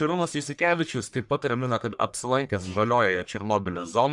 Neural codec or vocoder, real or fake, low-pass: codec, 44.1 kHz, 1.7 kbps, Pupu-Codec; fake; 10.8 kHz